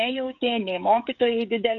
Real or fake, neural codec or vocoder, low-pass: fake; codec, 16 kHz, 16 kbps, FreqCodec, smaller model; 7.2 kHz